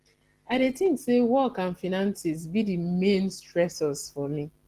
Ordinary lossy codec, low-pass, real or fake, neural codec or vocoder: Opus, 16 kbps; 14.4 kHz; real; none